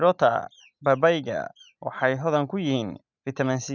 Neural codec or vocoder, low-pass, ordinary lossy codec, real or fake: none; 7.2 kHz; none; real